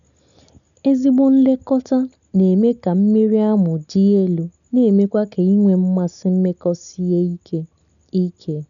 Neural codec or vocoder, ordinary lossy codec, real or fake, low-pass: none; none; real; 7.2 kHz